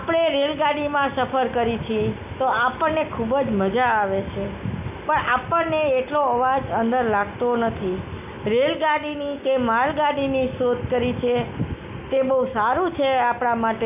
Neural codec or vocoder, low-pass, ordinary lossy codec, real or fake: none; 3.6 kHz; none; real